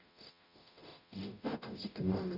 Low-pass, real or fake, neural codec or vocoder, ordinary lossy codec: 5.4 kHz; fake; codec, 44.1 kHz, 0.9 kbps, DAC; none